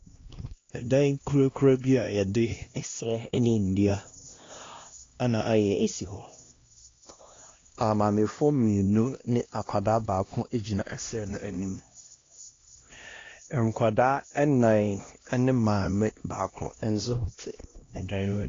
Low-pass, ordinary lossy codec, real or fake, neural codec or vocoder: 7.2 kHz; AAC, 32 kbps; fake; codec, 16 kHz, 1 kbps, X-Codec, HuBERT features, trained on LibriSpeech